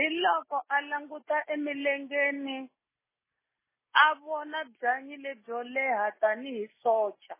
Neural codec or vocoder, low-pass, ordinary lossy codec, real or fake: none; 3.6 kHz; MP3, 16 kbps; real